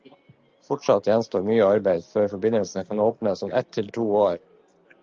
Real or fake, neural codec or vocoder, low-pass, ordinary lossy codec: real; none; 7.2 kHz; Opus, 24 kbps